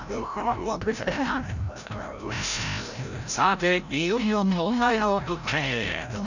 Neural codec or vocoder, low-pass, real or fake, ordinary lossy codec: codec, 16 kHz, 0.5 kbps, FreqCodec, larger model; 7.2 kHz; fake; none